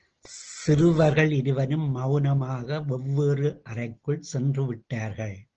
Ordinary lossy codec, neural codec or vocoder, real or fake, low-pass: Opus, 16 kbps; none; real; 7.2 kHz